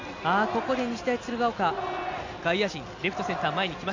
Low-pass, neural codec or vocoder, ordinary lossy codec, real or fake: 7.2 kHz; none; AAC, 48 kbps; real